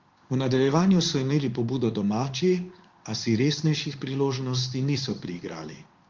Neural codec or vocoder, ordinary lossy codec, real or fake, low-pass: codec, 16 kHz in and 24 kHz out, 1 kbps, XY-Tokenizer; Opus, 32 kbps; fake; 7.2 kHz